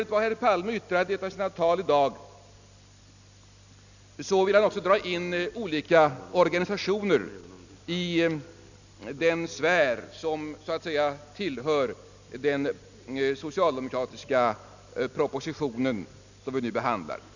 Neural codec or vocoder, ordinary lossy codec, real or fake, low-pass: none; none; real; 7.2 kHz